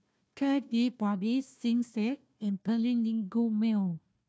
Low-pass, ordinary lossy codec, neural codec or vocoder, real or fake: none; none; codec, 16 kHz, 1 kbps, FunCodec, trained on Chinese and English, 50 frames a second; fake